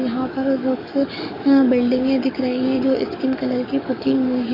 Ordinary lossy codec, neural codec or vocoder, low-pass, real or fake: none; codec, 44.1 kHz, 7.8 kbps, DAC; 5.4 kHz; fake